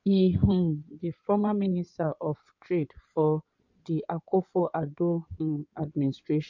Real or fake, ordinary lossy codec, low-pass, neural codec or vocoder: fake; MP3, 48 kbps; 7.2 kHz; codec, 16 kHz in and 24 kHz out, 2.2 kbps, FireRedTTS-2 codec